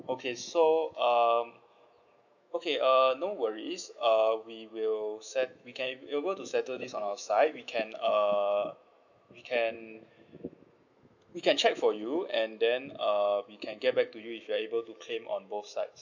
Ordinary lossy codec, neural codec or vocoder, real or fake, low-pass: none; autoencoder, 48 kHz, 128 numbers a frame, DAC-VAE, trained on Japanese speech; fake; 7.2 kHz